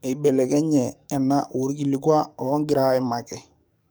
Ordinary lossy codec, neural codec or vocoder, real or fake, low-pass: none; codec, 44.1 kHz, 7.8 kbps, DAC; fake; none